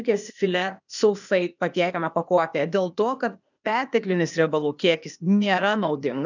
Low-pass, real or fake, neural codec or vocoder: 7.2 kHz; fake; codec, 16 kHz, 0.8 kbps, ZipCodec